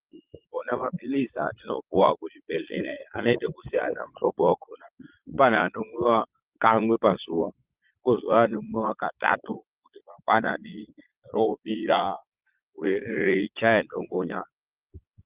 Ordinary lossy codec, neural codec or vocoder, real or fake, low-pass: Opus, 32 kbps; vocoder, 22.05 kHz, 80 mel bands, Vocos; fake; 3.6 kHz